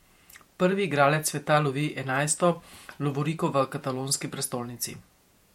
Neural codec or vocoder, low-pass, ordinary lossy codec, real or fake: vocoder, 48 kHz, 128 mel bands, Vocos; 19.8 kHz; MP3, 64 kbps; fake